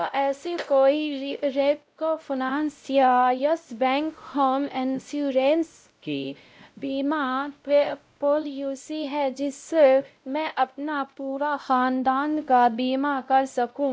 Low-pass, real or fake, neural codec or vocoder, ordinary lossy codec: none; fake; codec, 16 kHz, 0.5 kbps, X-Codec, WavLM features, trained on Multilingual LibriSpeech; none